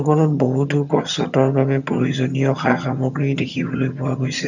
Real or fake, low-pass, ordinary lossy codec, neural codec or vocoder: fake; 7.2 kHz; none; vocoder, 22.05 kHz, 80 mel bands, HiFi-GAN